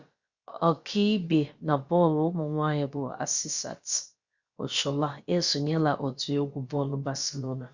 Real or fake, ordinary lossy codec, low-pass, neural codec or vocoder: fake; Opus, 64 kbps; 7.2 kHz; codec, 16 kHz, about 1 kbps, DyCAST, with the encoder's durations